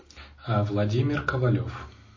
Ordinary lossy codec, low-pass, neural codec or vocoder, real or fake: MP3, 32 kbps; 7.2 kHz; none; real